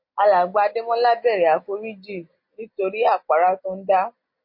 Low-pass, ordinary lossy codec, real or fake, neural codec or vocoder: 5.4 kHz; MP3, 32 kbps; real; none